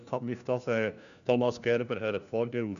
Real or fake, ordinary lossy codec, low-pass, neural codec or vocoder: fake; none; 7.2 kHz; codec, 16 kHz, 1 kbps, FunCodec, trained on LibriTTS, 50 frames a second